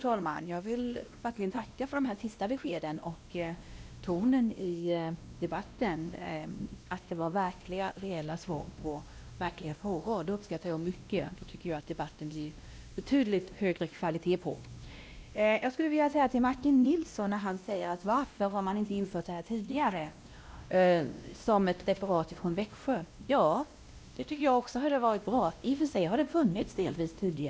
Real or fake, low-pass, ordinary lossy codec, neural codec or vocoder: fake; none; none; codec, 16 kHz, 1 kbps, X-Codec, WavLM features, trained on Multilingual LibriSpeech